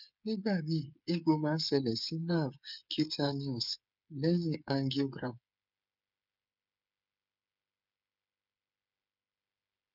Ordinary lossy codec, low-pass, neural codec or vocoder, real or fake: none; 5.4 kHz; codec, 16 kHz, 8 kbps, FreqCodec, smaller model; fake